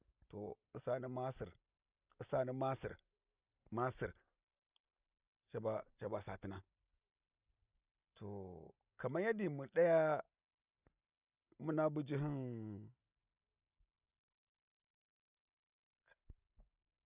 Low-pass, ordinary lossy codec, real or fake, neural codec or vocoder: 3.6 kHz; Opus, 64 kbps; real; none